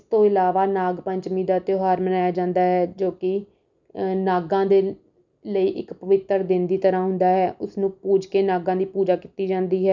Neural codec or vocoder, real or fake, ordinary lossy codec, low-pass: none; real; none; 7.2 kHz